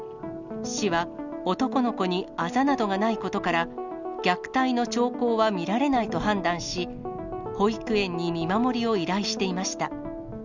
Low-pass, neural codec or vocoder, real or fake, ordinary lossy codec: 7.2 kHz; none; real; none